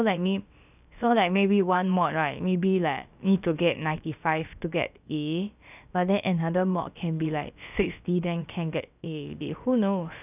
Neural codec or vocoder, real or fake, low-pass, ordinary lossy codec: codec, 16 kHz, about 1 kbps, DyCAST, with the encoder's durations; fake; 3.6 kHz; none